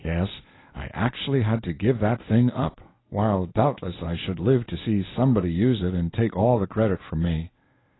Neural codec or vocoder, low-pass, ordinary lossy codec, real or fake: codec, 24 kHz, 0.9 kbps, WavTokenizer, medium speech release version 1; 7.2 kHz; AAC, 16 kbps; fake